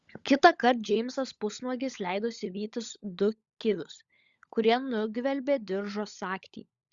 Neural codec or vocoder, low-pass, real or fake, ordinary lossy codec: codec, 16 kHz, 16 kbps, FunCodec, trained on LibriTTS, 50 frames a second; 7.2 kHz; fake; Opus, 64 kbps